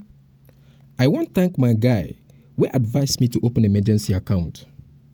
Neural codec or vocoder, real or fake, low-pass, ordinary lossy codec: vocoder, 48 kHz, 128 mel bands, Vocos; fake; none; none